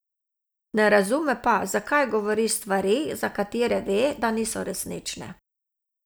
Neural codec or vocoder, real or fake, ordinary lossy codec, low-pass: none; real; none; none